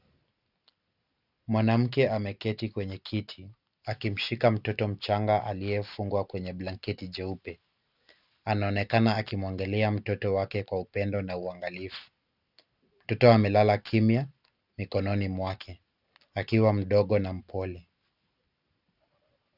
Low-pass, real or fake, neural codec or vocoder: 5.4 kHz; real; none